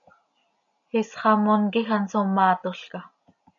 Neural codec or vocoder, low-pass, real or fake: none; 7.2 kHz; real